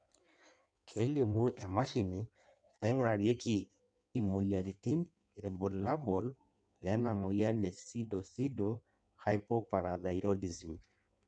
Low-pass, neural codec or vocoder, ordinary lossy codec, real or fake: 9.9 kHz; codec, 16 kHz in and 24 kHz out, 1.1 kbps, FireRedTTS-2 codec; none; fake